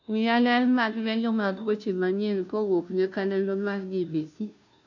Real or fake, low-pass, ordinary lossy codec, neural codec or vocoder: fake; 7.2 kHz; none; codec, 16 kHz, 0.5 kbps, FunCodec, trained on Chinese and English, 25 frames a second